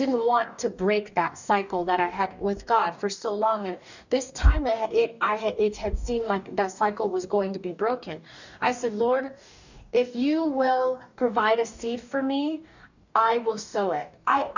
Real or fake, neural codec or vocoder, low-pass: fake; codec, 44.1 kHz, 2.6 kbps, DAC; 7.2 kHz